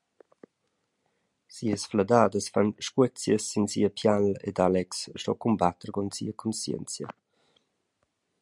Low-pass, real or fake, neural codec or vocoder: 10.8 kHz; real; none